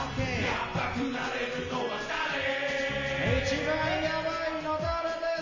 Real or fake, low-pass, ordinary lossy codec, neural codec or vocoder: real; 7.2 kHz; MP3, 32 kbps; none